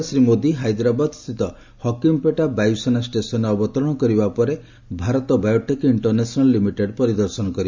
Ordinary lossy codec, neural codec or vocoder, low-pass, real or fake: MP3, 64 kbps; none; 7.2 kHz; real